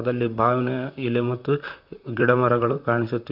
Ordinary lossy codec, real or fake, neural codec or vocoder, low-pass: none; fake; vocoder, 44.1 kHz, 128 mel bands, Pupu-Vocoder; 5.4 kHz